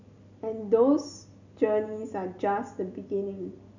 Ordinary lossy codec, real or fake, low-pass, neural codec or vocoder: none; real; 7.2 kHz; none